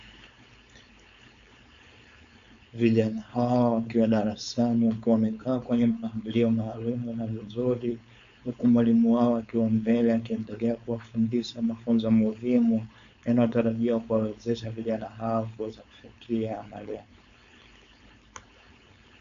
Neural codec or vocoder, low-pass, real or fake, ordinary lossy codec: codec, 16 kHz, 4.8 kbps, FACodec; 7.2 kHz; fake; MP3, 64 kbps